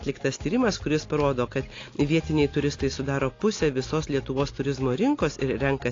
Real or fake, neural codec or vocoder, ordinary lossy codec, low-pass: real; none; AAC, 32 kbps; 7.2 kHz